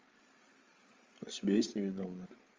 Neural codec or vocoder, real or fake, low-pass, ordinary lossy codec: codec, 16 kHz, 8 kbps, FreqCodec, larger model; fake; 7.2 kHz; Opus, 32 kbps